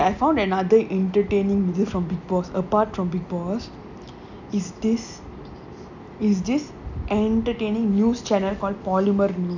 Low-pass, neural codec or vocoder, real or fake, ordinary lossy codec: 7.2 kHz; none; real; none